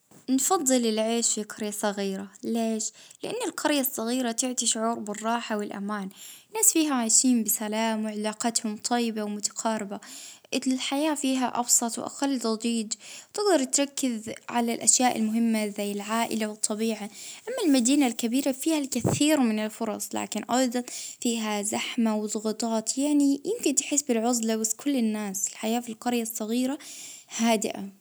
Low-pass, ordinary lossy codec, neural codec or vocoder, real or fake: none; none; none; real